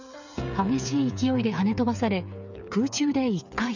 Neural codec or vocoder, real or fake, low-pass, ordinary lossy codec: codec, 16 kHz, 8 kbps, FreqCodec, smaller model; fake; 7.2 kHz; none